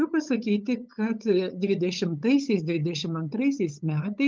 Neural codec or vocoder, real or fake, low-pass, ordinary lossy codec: codec, 16 kHz, 8 kbps, FunCodec, trained on LibriTTS, 25 frames a second; fake; 7.2 kHz; Opus, 24 kbps